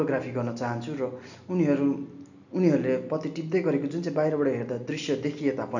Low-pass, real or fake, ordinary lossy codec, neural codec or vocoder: 7.2 kHz; real; none; none